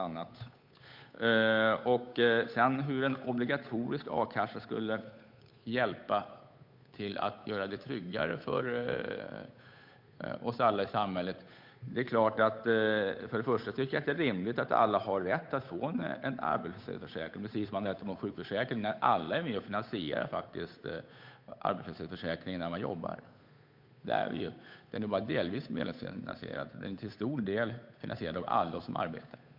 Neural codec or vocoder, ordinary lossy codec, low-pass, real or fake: codec, 16 kHz, 8 kbps, FunCodec, trained on Chinese and English, 25 frames a second; MP3, 48 kbps; 5.4 kHz; fake